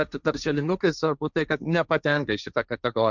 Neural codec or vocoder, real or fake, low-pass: codec, 16 kHz, 1.1 kbps, Voila-Tokenizer; fake; 7.2 kHz